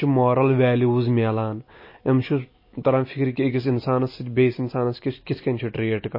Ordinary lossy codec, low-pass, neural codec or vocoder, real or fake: MP3, 24 kbps; 5.4 kHz; none; real